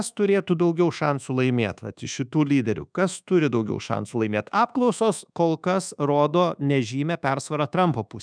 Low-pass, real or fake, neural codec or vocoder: 9.9 kHz; fake; codec, 24 kHz, 1.2 kbps, DualCodec